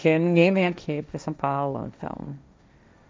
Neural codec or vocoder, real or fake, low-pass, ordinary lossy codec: codec, 16 kHz, 1.1 kbps, Voila-Tokenizer; fake; none; none